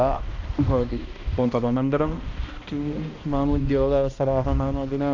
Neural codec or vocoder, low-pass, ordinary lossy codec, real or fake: codec, 16 kHz, 1 kbps, X-Codec, HuBERT features, trained on balanced general audio; 7.2 kHz; AAC, 48 kbps; fake